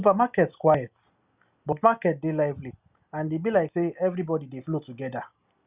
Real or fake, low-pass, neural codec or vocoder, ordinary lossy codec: real; 3.6 kHz; none; Opus, 64 kbps